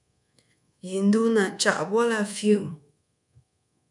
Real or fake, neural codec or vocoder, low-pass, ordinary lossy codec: fake; codec, 24 kHz, 1.2 kbps, DualCodec; 10.8 kHz; MP3, 96 kbps